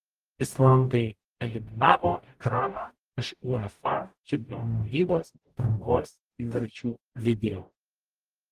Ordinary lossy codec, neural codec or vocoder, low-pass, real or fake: Opus, 24 kbps; codec, 44.1 kHz, 0.9 kbps, DAC; 14.4 kHz; fake